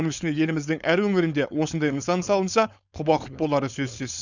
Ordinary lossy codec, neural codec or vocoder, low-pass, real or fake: none; codec, 16 kHz, 4.8 kbps, FACodec; 7.2 kHz; fake